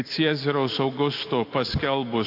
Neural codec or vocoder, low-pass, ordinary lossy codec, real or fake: none; 5.4 kHz; AAC, 32 kbps; real